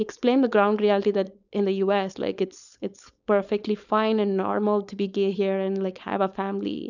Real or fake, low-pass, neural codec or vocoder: fake; 7.2 kHz; codec, 16 kHz, 4.8 kbps, FACodec